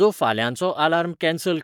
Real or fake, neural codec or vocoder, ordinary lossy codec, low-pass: fake; codec, 44.1 kHz, 7.8 kbps, Pupu-Codec; none; 19.8 kHz